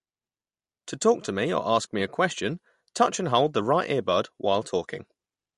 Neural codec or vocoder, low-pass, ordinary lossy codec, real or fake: none; 14.4 kHz; MP3, 48 kbps; real